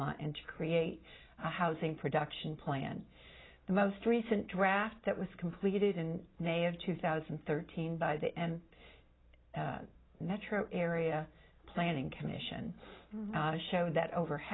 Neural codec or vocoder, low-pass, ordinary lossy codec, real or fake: none; 7.2 kHz; AAC, 16 kbps; real